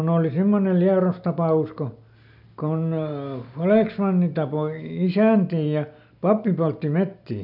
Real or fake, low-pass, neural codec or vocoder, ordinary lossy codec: real; 5.4 kHz; none; none